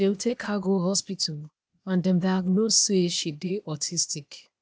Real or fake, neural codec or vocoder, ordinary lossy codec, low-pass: fake; codec, 16 kHz, 0.8 kbps, ZipCodec; none; none